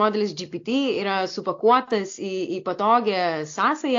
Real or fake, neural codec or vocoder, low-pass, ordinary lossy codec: real; none; 7.2 kHz; AAC, 48 kbps